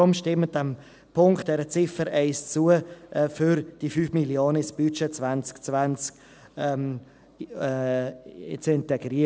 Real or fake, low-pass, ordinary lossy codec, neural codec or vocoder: real; none; none; none